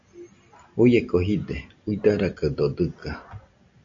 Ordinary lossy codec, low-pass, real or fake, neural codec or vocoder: AAC, 48 kbps; 7.2 kHz; real; none